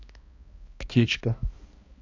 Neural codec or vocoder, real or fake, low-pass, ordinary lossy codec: codec, 16 kHz, 1 kbps, X-Codec, HuBERT features, trained on balanced general audio; fake; 7.2 kHz; AAC, 48 kbps